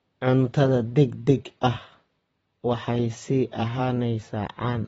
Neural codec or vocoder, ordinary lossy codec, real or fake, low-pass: vocoder, 44.1 kHz, 128 mel bands, Pupu-Vocoder; AAC, 24 kbps; fake; 19.8 kHz